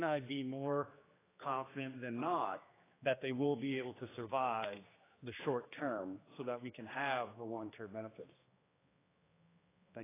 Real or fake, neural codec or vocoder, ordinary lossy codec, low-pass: fake; codec, 16 kHz, 2 kbps, X-Codec, HuBERT features, trained on general audio; AAC, 16 kbps; 3.6 kHz